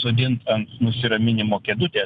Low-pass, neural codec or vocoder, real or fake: 10.8 kHz; none; real